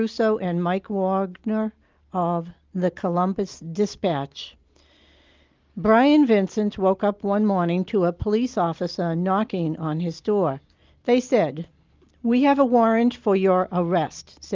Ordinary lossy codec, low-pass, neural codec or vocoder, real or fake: Opus, 24 kbps; 7.2 kHz; none; real